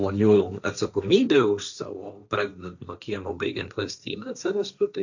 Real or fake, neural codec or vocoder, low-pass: fake; codec, 16 kHz, 1.1 kbps, Voila-Tokenizer; 7.2 kHz